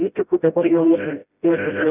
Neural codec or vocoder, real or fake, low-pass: codec, 16 kHz, 0.5 kbps, FreqCodec, smaller model; fake; 3.6 kHz